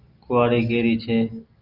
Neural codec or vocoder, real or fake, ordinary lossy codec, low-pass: none; real; Opus, 32 kbps; 5.4 kHz